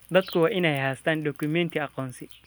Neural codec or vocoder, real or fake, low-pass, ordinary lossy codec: none; real; none; none